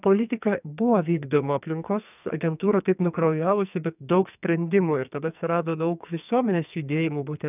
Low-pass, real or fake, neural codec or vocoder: 3.6 kHz; fake; codec, 44.1 kHz, 2.6 kbps, SNAC